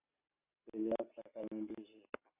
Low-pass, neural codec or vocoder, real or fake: 3.6 kHz; none; real